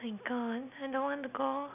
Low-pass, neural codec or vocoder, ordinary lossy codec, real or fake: 3.6 kHz; none; none; real